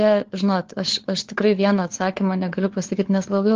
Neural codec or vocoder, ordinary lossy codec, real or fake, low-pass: codec, 16 kHz, 4 kbps, FunCodec, trained on LibriTTS, 50 frames a second; Opus, 16 kbps; fake; 7.2 kHz